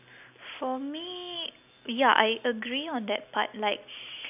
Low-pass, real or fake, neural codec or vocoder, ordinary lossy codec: 3.6 kHz; real; none; none